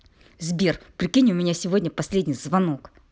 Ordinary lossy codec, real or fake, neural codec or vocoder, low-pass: none; real; none; none